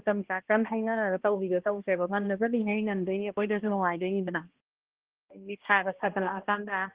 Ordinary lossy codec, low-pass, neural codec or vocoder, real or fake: Opus, 16 kbps; 3.6 kHz; codec, 16 kHz, 1 kbps, X-Codec, HuBERT features, trained on balanced general audio; fake